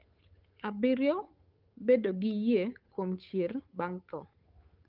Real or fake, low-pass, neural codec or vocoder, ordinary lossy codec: fake; 5.4 kHz; codec, 16 kHz, 8 kbps, FunCodec, trained on Chinese and English, 25 frames a second; Opus, 24 kbps